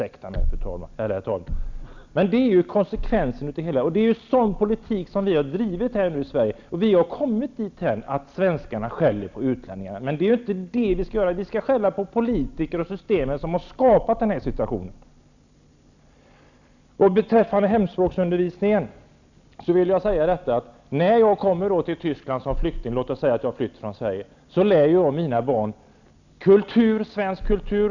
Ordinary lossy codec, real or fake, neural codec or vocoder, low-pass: none; real; none; 7.2 kHz